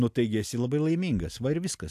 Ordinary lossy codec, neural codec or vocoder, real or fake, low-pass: AAC, 96 kbps; none; real; 14.4 kHz